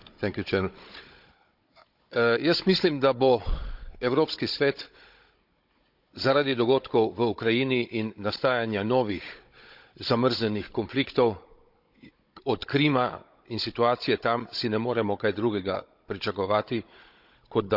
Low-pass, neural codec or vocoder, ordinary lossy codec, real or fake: 5.4 kHz; codec, 16 kHz, 16 kbps, FunCodec, trained on Chinese and English, 50 frames a second; none; fake